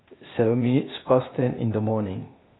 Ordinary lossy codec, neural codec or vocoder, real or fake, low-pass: AAC, 16 kbps; codec, 16 kHz, 0.8 kbps, ZipCodec; fake; 7.2 kHz